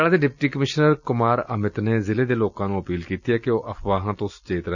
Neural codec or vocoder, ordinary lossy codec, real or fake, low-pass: none; none; real; 7.2 kHz